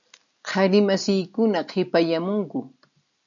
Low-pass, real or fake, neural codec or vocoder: 7.2 kHz; real; none